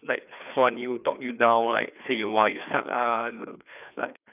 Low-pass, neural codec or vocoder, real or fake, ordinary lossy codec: 3.6 kHz; codec, 16 kHz, 2 kbps, FreqCodec, larger model; fake; none